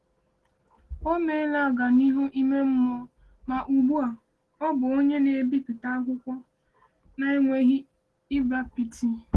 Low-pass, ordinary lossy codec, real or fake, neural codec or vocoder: 10.8 kHz; Opus, 16 kbps; real; none